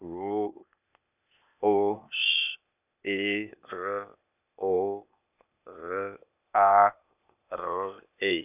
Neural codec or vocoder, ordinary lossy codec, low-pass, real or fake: codec, 16 kHz, 0.8 kbps, ZipCodec; none; 3.6 kHz; fake